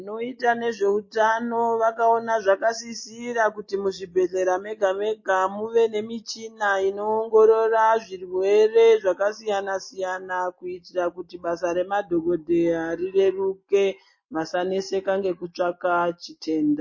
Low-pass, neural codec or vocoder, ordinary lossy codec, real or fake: 7.2 kHz; none; MP3, 32 kbps; real